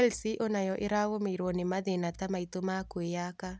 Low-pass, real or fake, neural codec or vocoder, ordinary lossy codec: none; real; none; none